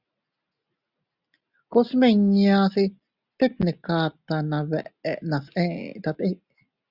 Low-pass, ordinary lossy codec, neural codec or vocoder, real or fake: 5.4 kHz; Opus, 64 kbps; none; real